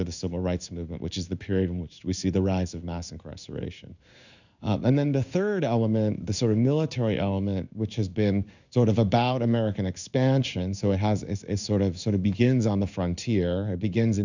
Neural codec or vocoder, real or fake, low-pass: codec, 16 kHz in and 24 kHz out, 1 kbps, XY-Tokenizer; fake; 7.2 kHz